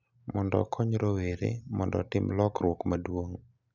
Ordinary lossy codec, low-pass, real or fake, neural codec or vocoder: none; 7.2 kHz; real; none